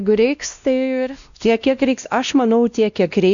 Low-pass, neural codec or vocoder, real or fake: 7.2 kHz; codec, 16 kHz, 1 kbps, X-Codec, WavLM features, trained on Multilingual LibriSpeech; fake